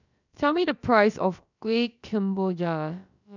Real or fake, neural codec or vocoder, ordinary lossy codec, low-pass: fake; codec, 16 kHz, about 1 kbps, DyCAST, with the encoder's durations; none; 7.2 kHz